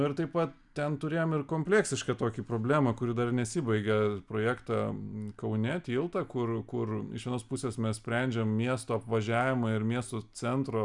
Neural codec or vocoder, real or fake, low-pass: none; real; 10.8 kHz